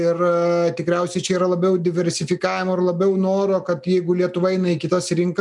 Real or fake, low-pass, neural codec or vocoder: real; 10.8 kHz; none